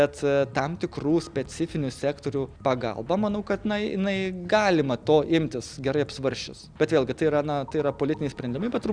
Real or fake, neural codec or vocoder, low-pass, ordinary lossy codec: real; none; 9.9 kHz; Opus, 64 kbps